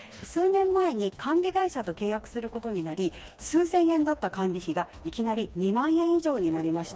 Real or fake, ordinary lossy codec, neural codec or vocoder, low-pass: fake; none; codec, 16 kHz, 2 kbps, FreqCodec, smaller model; none